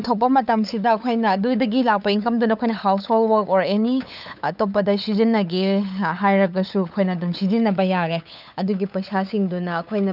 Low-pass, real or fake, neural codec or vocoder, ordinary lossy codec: 5.4 kHz; fake; codec, 16 kHz, 8 kbps, FunCodec, trained on LibriTTS, 25 frames a second; none